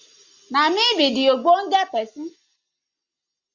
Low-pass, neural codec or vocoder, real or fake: 7.2 kHz; none; real